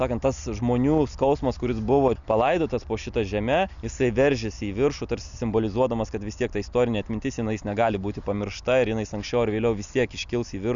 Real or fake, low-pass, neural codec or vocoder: real; 7.2 kHz; none